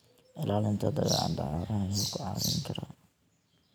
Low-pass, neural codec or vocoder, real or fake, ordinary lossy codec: none; vocoder, 44.1 kHz, 128 mel bands every 512 samples, BigVGAN v2; fake; none